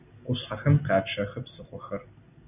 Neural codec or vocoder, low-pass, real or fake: none; 3.6 kHz; real